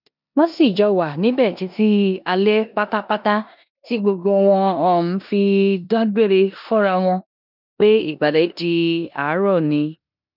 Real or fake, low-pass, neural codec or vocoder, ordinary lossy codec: fake; 5.4 kHz; codec, 16 kHz in and 24 kHz out, 0.9 kbps, LongCat-Audio-Codec, four codebook decoder; none